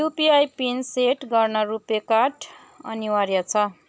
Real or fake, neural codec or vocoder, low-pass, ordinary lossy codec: real; none; none; none